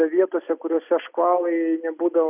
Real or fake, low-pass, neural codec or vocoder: real; 3.6 kHz; none